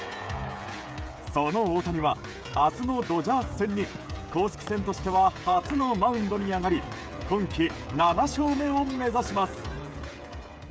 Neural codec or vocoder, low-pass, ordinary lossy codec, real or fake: codec, 16 kHz, 16 kbps, FreqCodec, smaller model; none; none; fake